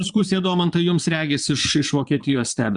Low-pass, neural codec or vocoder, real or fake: 9.9 kHz; vocoder, 22.05 kHz, 80 mel bands, Vocos; fake